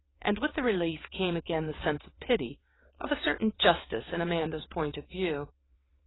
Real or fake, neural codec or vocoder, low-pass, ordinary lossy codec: fake; vocoder, 22.05 kHz, 80 mel bands, WaveNeXt; 7.2 kHz; AAC, 16 kbps